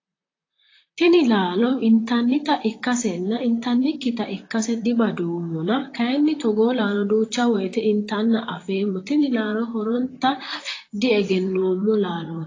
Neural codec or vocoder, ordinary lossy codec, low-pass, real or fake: vocoder, 44.1 kHz, 128 mel bands, Pupu-Vocoder; AAC, 32 kbps; 7.2 kHz; fake